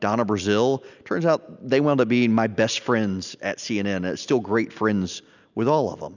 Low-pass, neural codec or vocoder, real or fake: 7.2 kHz; none; real